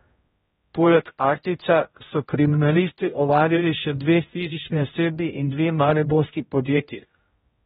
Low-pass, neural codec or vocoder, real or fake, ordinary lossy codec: 7.2 kHz; codec, 16 kHz, 0.5 kbps, X-Codec, HuBERT features, trained on general audio; fake; AAC, 16 kbps